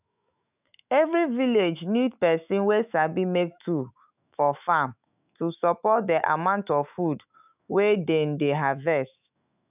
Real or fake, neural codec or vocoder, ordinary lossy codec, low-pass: fake; autoencoder, 48 kHz, 128 numbers a frame, DAC-VAE, trained on Japanese speech; none; 3.6 kHz